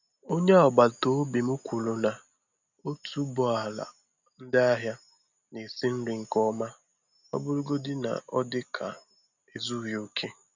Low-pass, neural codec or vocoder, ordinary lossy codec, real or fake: 7.2 kHz; none; none; real